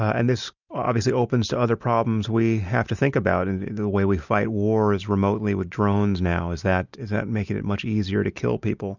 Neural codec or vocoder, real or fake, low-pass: none; real; 7.2 kHz